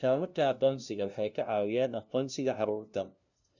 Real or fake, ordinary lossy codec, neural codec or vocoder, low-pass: fake; none; codec, 16 kHz, 0.5 kbps, FunCodec, trained on LibriTTS, 25 frames a second; 7.2 kHz